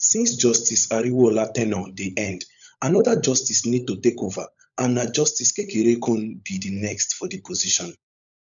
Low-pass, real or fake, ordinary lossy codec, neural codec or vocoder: 7.2 kHz; fake; none; codec, 16 kHz, 16 kbps, FunCodec, trained on LibriTTS, 50 frames a second